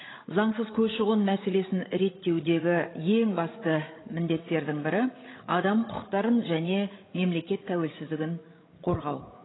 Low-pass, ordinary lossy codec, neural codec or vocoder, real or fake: 7.2 kHz; AAC, 16 kbps; codec, 16 kHz, 16 kbps, FreqCodec, larger model; fake